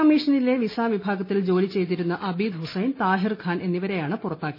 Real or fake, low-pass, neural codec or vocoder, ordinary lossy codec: real; 5.4 kHz; none; MP3, 24 kbps